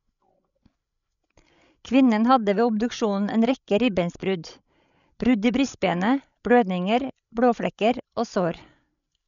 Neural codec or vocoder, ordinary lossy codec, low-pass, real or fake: codec, 16 kHz, 16 kbps, FreqCodec, larger model; none; 7.2 kHz; fake